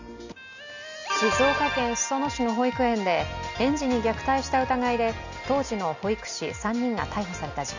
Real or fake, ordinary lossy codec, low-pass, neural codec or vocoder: real; none; 7.2 kHz; none